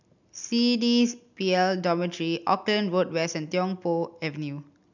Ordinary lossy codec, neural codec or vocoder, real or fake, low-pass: none; none; real; 7.2 kHz